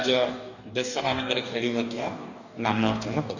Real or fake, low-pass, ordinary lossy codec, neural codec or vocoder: fake; 7.2 kHz; none; codec, 44.1 kHz, 2.6 kbps, DAC